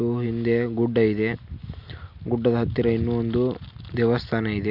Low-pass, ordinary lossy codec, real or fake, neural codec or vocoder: 5.4 kHz; MP3, 48 kbps; real; none